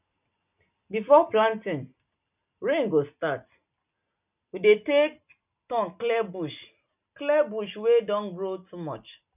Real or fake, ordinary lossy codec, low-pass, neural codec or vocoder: real; none; 3.6 kHz; none